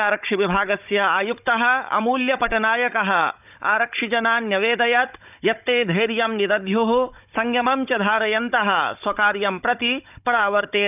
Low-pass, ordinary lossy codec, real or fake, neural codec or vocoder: 3.6 kHz; none; fake; codec, 16 kHz, 16 kbps, FunCodec, trained on Chinese and English, 50 frames a second